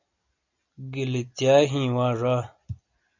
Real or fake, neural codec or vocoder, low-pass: real; none; 7.2 kHz